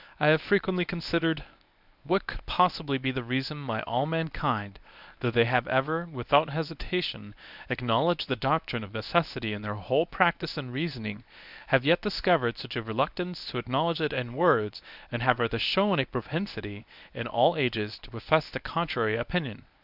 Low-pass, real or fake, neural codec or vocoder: 5.4 kHz; fake; codec, 24 kHz, 0.9 kbps, WavTokenizer, medium speech release version 1